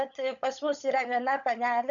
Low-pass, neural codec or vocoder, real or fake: 7.2 kHz; codec, 16 kHz, 16 kbps, FunCodec, trained on LibriTTS, 50 frames a second; fake